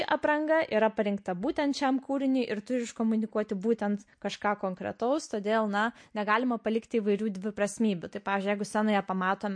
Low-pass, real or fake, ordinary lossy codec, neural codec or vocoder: 9.9 kHz; real; MP3, 48 kbps; none